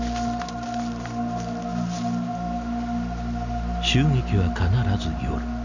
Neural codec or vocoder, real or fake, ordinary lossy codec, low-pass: none; real; none; 7.2 kHz